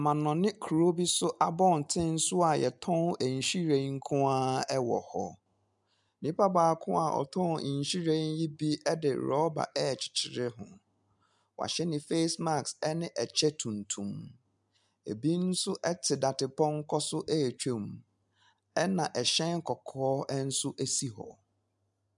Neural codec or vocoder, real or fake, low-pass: none; real; 10.8 kHz